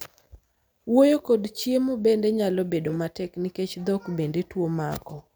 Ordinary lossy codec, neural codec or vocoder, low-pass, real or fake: none; none; none; real